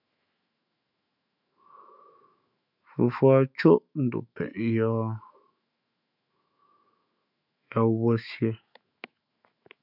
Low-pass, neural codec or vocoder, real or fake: 5.4 kHz; autoencoder, 48 kHz, 128 numbers a frame, DAC-VAE, trained on Japanese speech; fake